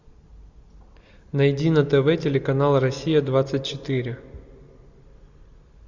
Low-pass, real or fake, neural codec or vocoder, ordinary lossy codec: 7.2 kHz; real; none; Opus, 64 kbps